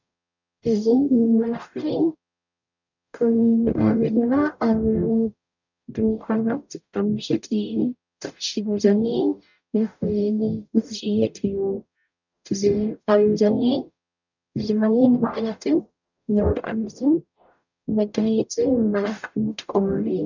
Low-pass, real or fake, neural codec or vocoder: 7.2 kHz; fake; codec, 44.1 kHz, 0.9 kbps, DAC